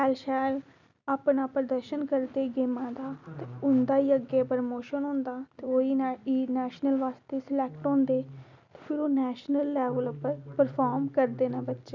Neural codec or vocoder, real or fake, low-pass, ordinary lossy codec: none; real; 7.2 kHz; none